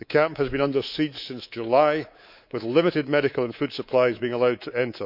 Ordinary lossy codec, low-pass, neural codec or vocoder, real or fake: none; 5.4 kHz; codec, 24 kHz, 3.1 kbps, DualCodec; fake